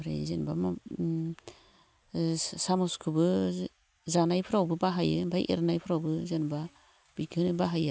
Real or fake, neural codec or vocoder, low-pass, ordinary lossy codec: real; none; none; none